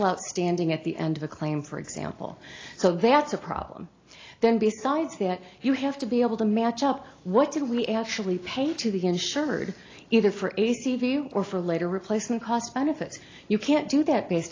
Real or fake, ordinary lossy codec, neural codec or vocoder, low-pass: real; AAC, 32 kbps; none; 7.2 kHz